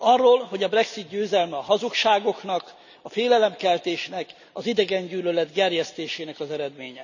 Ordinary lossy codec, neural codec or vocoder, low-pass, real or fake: none; none; 7.2 kHz; real